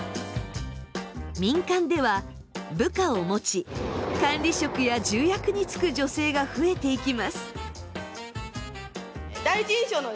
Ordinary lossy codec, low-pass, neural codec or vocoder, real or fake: none; none; none; real